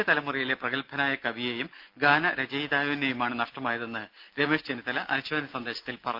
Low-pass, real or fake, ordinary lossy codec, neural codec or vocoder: 5.4 kHz; real; Opus, 32 kbps; none